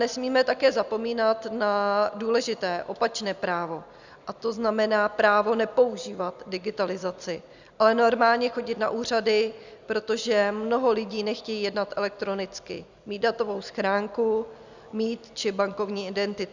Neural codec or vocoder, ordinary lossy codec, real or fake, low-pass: none; Opus, 64 kbps; real; 7.2 kHz